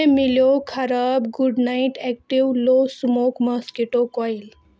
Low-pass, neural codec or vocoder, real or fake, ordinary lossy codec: none; none; real; none